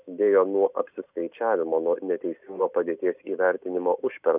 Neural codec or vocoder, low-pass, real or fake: none; 3.6 kHz; real